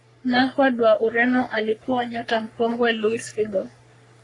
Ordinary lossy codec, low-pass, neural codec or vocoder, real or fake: AAC, 32 kbps; 10.8 kHz; codec, 44.1 kHz, 3.4 kbps, Pupu-Codec; fake